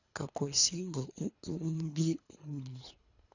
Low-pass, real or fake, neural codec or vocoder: 7.2 kHz; fake; codec, 24 kHz, 3 kbps, HILCodec